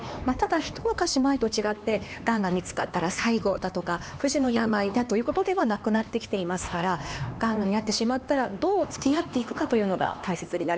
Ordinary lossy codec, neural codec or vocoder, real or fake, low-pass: none; codec, 16 kHz, 2 kbps, X-Codec, HuBERT features, trained on LibriSpeech; fake; none